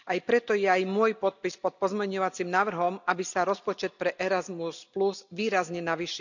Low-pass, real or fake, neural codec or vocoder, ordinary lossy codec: 7.2 kHz; real; none; none